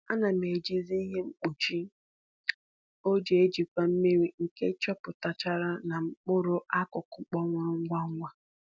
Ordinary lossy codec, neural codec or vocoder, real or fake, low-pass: none; none; real; none